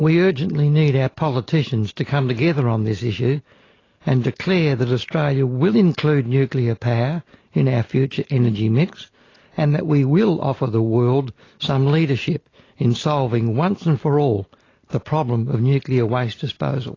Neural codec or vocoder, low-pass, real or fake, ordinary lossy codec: none; 7.2 kHz; real; AAC, 32 kbps